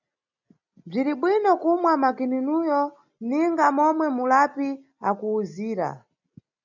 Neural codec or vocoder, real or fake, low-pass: none; real; 7.2 kHz